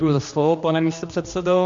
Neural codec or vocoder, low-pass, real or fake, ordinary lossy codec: codec, 16 kHz, 2 kbps, X-Codec, HuBERT features, trained on general audio; 7.2 kHz; fake; MP3, 48 kbps